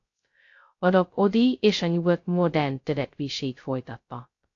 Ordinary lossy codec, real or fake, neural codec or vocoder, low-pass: AAC, 48 kbps; fake; codec, 16 kHz, 0.2 kbps, FocalCodec; 7.2 kHz